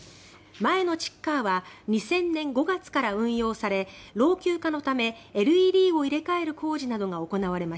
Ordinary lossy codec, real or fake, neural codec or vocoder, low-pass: none; real; none; none